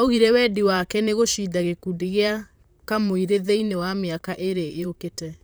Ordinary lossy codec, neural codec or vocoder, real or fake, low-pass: none; vocoder, 44.1 kHz, 128 mel bands, Pupu-Vocoder; fake; none